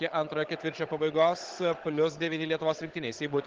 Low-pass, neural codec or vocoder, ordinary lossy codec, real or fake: 7.2 kHz; codec, 16 kHz, 16 kbps, FunCodec, trained on Chinese and English, 50 frames a second; Opus, 16 kbps; fake